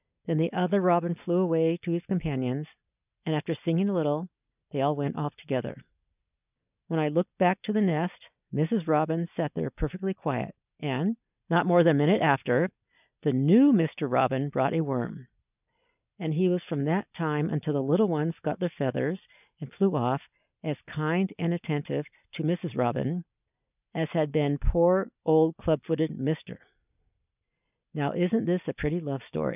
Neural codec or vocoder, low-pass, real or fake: none; 3.6 kHz; real